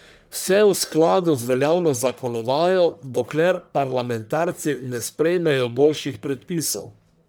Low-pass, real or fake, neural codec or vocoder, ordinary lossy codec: none; fake; codec, 44.1 kHz, 1.7 kbps, Pupu-Codec; none